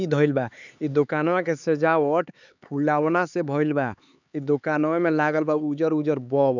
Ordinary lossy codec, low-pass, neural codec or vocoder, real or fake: none; 7.2 kHz; codec, 16 kHz, 4 kbps, X-Codec, HuBERT features, trained on LibriSpeech; fake